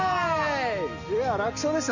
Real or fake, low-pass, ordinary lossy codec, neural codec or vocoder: real; 7.2 kHz; none; none